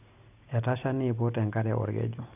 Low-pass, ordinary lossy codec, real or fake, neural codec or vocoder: 3.6 kHz; none; real; none